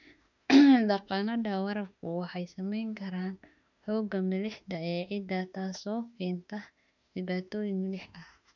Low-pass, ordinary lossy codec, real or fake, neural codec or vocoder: 7.2 kHz; none; fake; autoencoder, 48 kHz, 32 numbers a frame, DAC-VAE, trained on Japanese speech